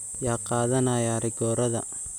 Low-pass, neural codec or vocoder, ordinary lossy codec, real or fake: none; vocoder, 44.1 kHz, 128 mel bands every 256 samples, BigVGAN v2; none; fake